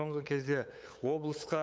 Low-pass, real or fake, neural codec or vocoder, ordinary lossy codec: none; fake; codec, 16 kHz, 8 kbps, FunCodec, trained on LibriTTS, 25 frames a second; none